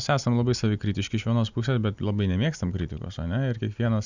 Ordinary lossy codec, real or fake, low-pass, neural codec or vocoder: Opus, 64 kbps; real; 7.2 kHz; none